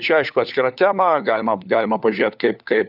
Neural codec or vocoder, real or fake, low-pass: codec, 16 kHz, 4 kbps, FunCodec, trained on LibriTTS, 50 frames a second; fake; 5.4 kHz